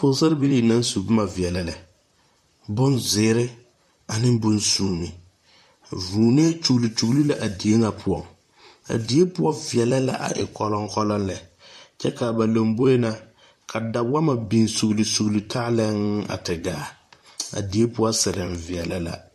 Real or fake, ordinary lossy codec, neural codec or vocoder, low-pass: fake; MP3, 64 kbps; vocoder, 44.1 kHz, 128 mel bands, Pupu-Vocoder; 14.4 kHz